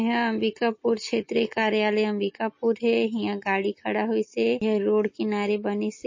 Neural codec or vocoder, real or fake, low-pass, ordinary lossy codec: none; real; 7.2 kHz; MP3, 32 kbps